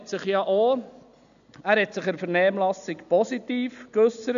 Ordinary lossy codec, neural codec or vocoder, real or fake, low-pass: none; none; real; 7.2 kHz